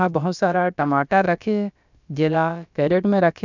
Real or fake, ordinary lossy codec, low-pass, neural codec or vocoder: fake; none; 7.2 kHz; codec, 16 kHz, about 1 kbps, DyCAST, with the encoder's durations